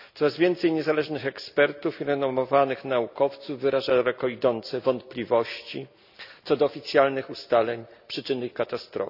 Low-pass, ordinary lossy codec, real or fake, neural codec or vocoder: 5.4 kHz; none; real; none